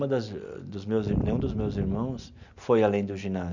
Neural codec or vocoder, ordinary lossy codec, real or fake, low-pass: none; none; real; 7.2 kHz